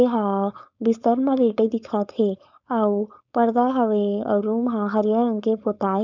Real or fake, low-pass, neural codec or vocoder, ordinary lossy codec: fake; 7.2 kHz; codec, 16 kHz, 4.8 kbps, FACodec; none